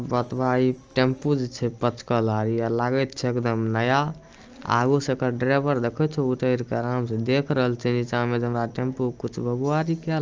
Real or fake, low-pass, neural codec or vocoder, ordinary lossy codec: real; 7.2 kHz; none; Opus, 24 kbps